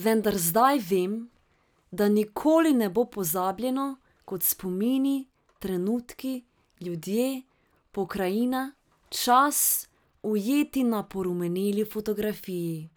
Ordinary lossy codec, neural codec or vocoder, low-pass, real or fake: none; none; none; real